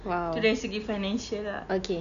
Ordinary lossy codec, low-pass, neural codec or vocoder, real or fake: none; 7.2 kHz; none; real